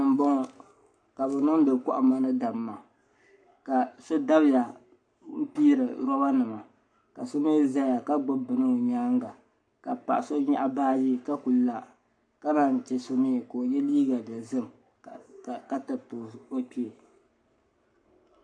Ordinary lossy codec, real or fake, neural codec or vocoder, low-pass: MP3, 96 kbps; fake; codec, 44.1 kHz, 7.8 kbps, Pupu-Codec; 9.9 kHz